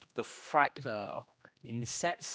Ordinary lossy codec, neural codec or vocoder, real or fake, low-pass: none; codec, 16 kHz, 1 kbps, X-Codec, HuBERT features, trained on general audio; fake; none